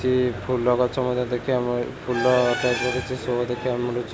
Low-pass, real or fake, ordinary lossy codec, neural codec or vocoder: none; real; none; none